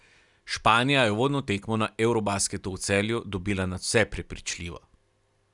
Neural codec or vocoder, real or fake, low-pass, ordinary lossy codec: none; real; 10.8 kHz; none